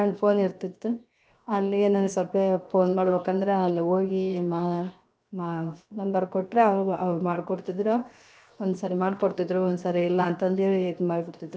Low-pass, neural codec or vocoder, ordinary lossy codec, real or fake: none; codec, 16 kHz, 0.7 kbps, FocalCodec; none; fake